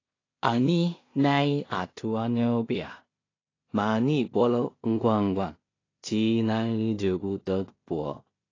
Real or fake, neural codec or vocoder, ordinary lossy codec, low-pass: fake; codec, 16 kHz in and 24 kHz out, 0.4 kbps, LongCat-Audio-Codec, two codebook decoder; AAC, 32 kbps; 7.2 kHz